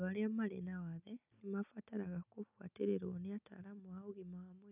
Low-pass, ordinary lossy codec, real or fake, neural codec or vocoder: 3.6 kHz; none; real; none